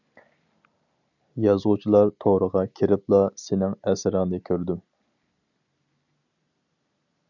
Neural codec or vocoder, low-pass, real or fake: none; 7.2 kHz; real